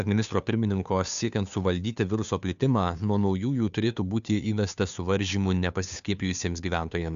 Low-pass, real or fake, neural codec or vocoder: 7.2 kHz; fake; codec, 16 kHz, 2 kbps, FunCodec, trained on Chinese and English, 25 frames a second